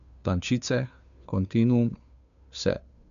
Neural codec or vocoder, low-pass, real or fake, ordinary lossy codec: codec, 16 kHz, 2 kbps, FunCodec, trained on Chinese and English, 25 frames a second; 7.2 kHz; fake; none